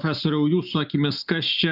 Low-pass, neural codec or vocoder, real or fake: 5.4 kHz; none; real